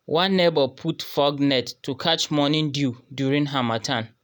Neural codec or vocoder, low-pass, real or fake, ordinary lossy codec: none; none; real; none